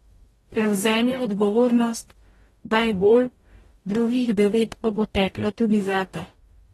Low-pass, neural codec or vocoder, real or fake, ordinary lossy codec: 19.8 kHz; codec, 44.1 kHz, 0.9 kbps, DAC; fake; AAC, 32 kbps